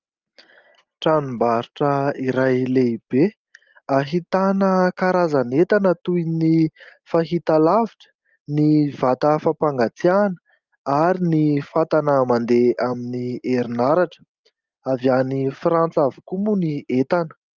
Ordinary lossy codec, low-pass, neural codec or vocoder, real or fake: Opus, 32 kbps; 7.2 kHz; none; real